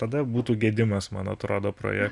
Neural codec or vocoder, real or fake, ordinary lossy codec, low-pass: none; real; Opus, 64 kbps; 10.8 kHz